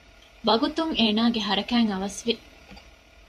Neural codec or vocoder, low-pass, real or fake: vocoder, 48 kHz, 128 mel bands, Vocos; 14.4 kHz; fake